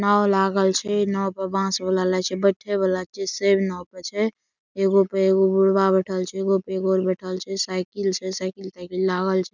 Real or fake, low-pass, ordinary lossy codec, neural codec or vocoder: real; 7.2 kHz; none; none